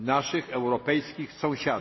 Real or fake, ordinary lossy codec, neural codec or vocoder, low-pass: real; MP3, 24 kbps; none; 7.2 kHz